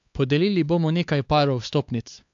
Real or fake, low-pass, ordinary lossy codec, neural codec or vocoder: fake; 7.2 kHz; AAC, 64 kbps; codec, 16 kHz, 4 kbps, X-Codec, HuBERT features, trained on LibriSpeech